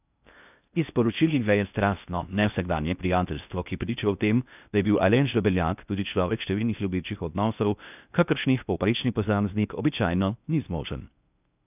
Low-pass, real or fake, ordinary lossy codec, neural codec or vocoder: 3.6 kHz; fake; none; codec, 16 kHz in and 24 kHz out, 0.6 kbps, FocalCodec, streaming, 4096 codes